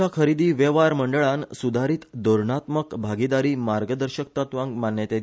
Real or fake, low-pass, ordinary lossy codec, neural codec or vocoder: real; none; none; none